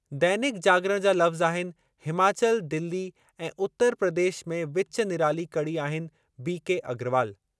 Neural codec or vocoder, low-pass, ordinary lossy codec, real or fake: none; none; none; real